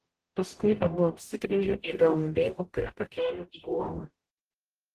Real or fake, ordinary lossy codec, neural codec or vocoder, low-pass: fake; Opus, 16 kbps; codec, 44.1 kHz, 0.9 kbps, DAC; 14.4 kHz